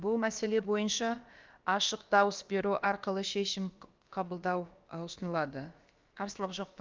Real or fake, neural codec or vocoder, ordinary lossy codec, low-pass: fake; codec, 16 kHz, about 1 kbps, DyCAST, with the encoder's durations; Opus, 24 kbps; 7.2 kHz